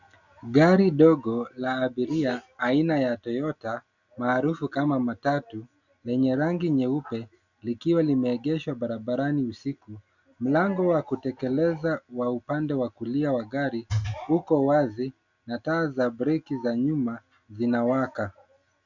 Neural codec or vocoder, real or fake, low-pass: none; real; 7.2 kHz